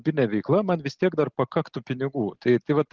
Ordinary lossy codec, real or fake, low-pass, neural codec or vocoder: Opus, 16 kbps; real; 7.2 kHz; none